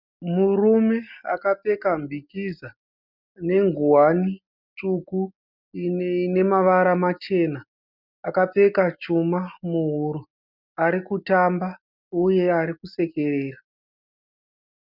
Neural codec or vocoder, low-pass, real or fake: none; 5.4 kHz; real